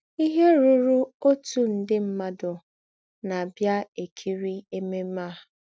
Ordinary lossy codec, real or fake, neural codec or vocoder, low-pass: none; real; none; none